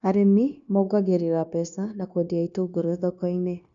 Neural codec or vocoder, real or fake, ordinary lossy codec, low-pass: codec, 16 kHz, 0.9 kbps, LongCat-Audio-Codec; fake; none; 7.2 kHz